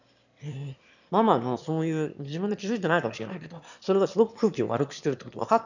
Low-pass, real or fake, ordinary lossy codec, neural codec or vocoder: 7.2 kHz; fake; none; autoencoder, 22.05 kHz, a latent of 192 numbers a frame, VITS, trained on one speaker